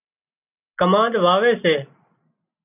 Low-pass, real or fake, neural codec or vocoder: 3.6 kHz; real; none